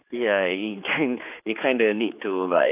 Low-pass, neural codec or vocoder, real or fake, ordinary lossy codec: 3.6 kHz; codec, 16 kHz, 4 kbps, X-Codec, HuBERT features, trained on general audio; fake; AAC, 32 kbps